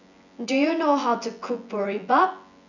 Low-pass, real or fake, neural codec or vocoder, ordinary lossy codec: 7.2 kHz; fake; vocoder, 24 kHz, 100 mel bands, Vocos; none